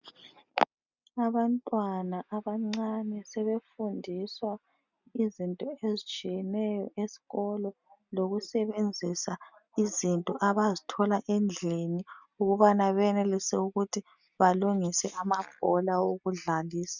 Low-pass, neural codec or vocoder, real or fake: 7.2 kHz; none; real